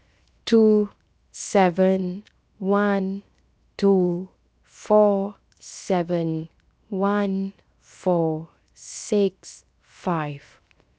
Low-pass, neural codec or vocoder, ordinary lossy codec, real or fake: none; codec, 16 kHz, 0.7 kbps, FocalCodec; none; fake